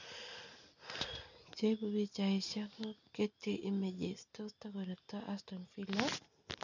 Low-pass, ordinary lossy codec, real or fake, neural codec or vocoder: 7.2 kHz; none; real; none